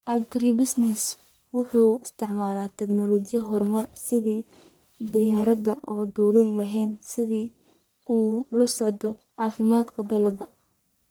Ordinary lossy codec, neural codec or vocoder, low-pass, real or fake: none; codec, 44.1 kHz, 1.7 kbps, Pupu-Codec; none; fake